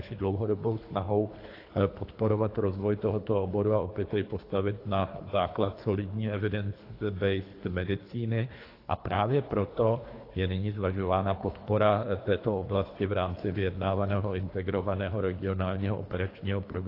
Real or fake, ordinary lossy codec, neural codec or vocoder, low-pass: fake; AAC, 32 kbps; codec, 24 kHz, 3 kbps, HILCodec; 5.4 kHz